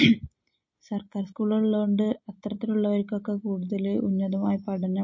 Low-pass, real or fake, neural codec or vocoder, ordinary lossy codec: 7.2 kHz; real; none; MP3, 32 kbps